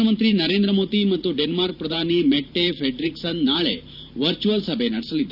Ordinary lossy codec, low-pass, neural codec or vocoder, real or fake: none; 5.4 kHz; vocoder, 44.1 kHz, 128 mel bands every 512 samples, BigVGAN v2; fake